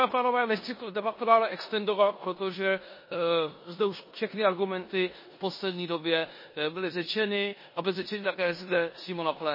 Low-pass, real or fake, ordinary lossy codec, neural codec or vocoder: 5.4 kHz; fake; MP3, 24 kbps; codec, 16 kHz in and 24 kHz out, 0.9 kbps, LongCat-Audio-Codec, four codebook decoder